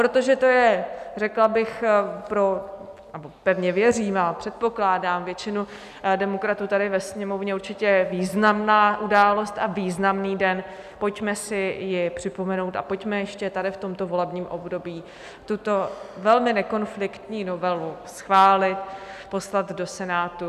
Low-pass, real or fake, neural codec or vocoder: 14.4 kHz; real; none